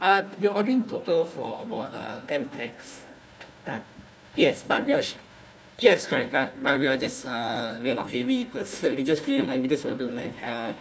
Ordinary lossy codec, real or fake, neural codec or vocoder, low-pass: none; fake; codec, 16 kHz, 1 kbps, FunCodec, trained on Chinese and English, 50 frames a second; none